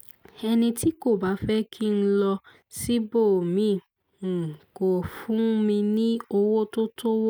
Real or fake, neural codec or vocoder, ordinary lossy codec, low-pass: real; none; none; none